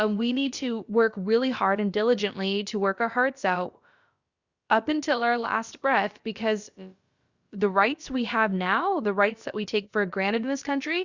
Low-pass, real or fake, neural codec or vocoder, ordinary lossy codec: 7.2 kHz; fake; codec, 16 kHz, about 1 kbps, DyCAST, with the encoder's durations; Opus, 64 kbps